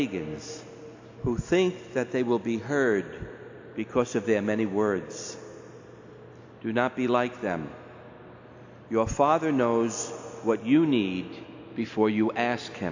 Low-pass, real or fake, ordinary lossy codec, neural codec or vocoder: 7.2 kHz; real; AAC, 48 kbps; none